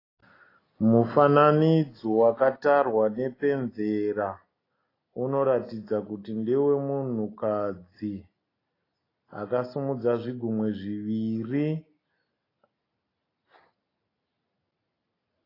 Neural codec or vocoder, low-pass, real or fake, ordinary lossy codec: none; 5.4 kHz; real; AAC, 24 kbps